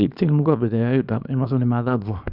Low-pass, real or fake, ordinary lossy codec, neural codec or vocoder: 5.4 kHz; fake; none; codec, 24 kHz, 0.9 kbps, WavTokenizer, small release